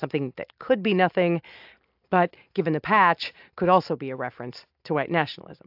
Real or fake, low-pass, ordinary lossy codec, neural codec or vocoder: real; 5.4 kHz; AAC, 48 kbps; none